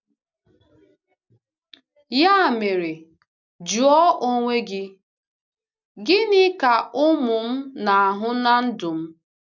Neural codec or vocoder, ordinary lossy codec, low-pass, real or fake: none; none; 7.2 kHz; real